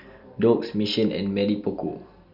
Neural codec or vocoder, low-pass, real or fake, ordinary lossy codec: none; 5.4 kHz; real; none